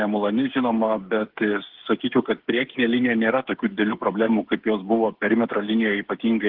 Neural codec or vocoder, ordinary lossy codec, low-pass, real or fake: codec, 16 kHz, 8 kbps, FreqCodec, smaller model; Opus, 16 kbps; 5.4 kHz; fake